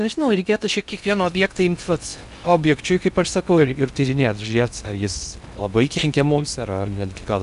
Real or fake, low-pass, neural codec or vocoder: fake; 10.8 kHz; codec, 16 kHz in and 24 kHz out, 0.6 kbps, FocalCodec, streaming, 2048 codes